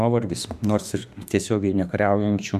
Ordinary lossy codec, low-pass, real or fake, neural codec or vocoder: Opus, 64 kbps; 14.4 kHz; fake; autoencoder, 48 kHz, 32 numbers a frame, DAC-VAE, trained on Japanese speech